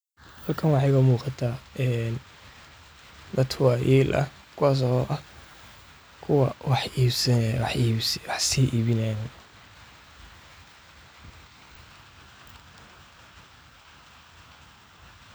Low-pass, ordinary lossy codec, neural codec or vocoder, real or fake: none; none; none; real